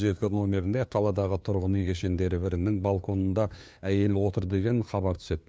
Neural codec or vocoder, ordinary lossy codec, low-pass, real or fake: codec, 16 kHz, 2 kbps, FunCodec, trained on LibriTTS, 25 frames a second; none; none; fake